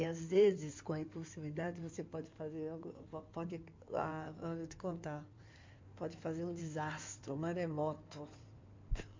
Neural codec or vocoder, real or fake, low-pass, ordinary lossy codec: codec, 16 kHz in and 24 kHz out, 2.2 kbps, FireRedTTS-2 codec; fake; 7.2 kHz; MP3, 48 kbps